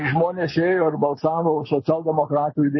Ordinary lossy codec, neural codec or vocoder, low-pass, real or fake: MP3, 24 kbps; none; 7.2 kHz; real